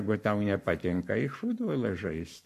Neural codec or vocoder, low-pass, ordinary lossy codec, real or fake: codec, 44.1 kHz, 7.8 kbps, Pupu-Codec; 14.4 kHz; MP3, 64 kbps; fake